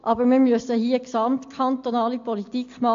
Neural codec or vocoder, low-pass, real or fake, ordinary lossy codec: none; 7.2 kHz; real; AAC, 48 kbps